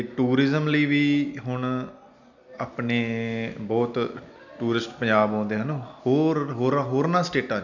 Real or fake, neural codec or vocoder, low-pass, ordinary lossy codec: real; none; 7.2 kHz; none